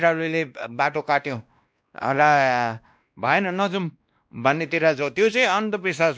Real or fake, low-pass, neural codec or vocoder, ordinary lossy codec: fake; none; codec, 16 kHz, 1 kbps, X-Codec, WavLM features, trained on Multilingual LibriSpeech; none